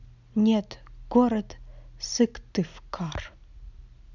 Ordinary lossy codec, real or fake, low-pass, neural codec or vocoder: none; real; 7.2 kHz; none